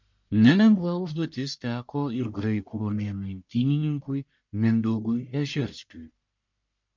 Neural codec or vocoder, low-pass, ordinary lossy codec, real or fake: codec, 44.1 kHz, 1.7 kbps, Pupu-Codec; 7.2 kHz; MP3, 64 kbps; fake